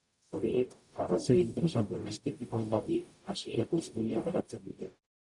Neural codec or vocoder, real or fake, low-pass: codec, 44.1 kHz, 0.9 kbps, DAC; fake; 10.8 kHz